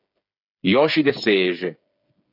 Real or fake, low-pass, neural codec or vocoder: fake; 5.4 kHz; codec, 16 kHz, 8 kbps, FreqCodec, smaller model